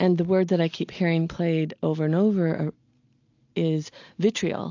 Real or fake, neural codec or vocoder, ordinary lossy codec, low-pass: real; none; AAC, 48 kbps; 7.2 kHz